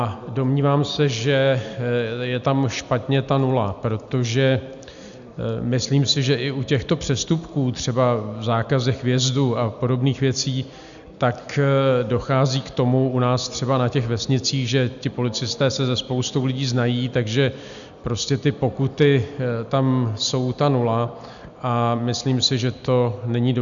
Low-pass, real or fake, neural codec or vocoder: 7.2 kHz; real; none